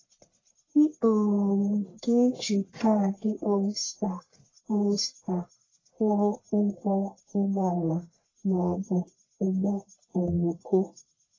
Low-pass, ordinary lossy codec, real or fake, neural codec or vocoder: 7.2 kHz; AAC, 32 kbps; fake; codec, 44.1 kHz, 1.7 kbps, Pupu-Codec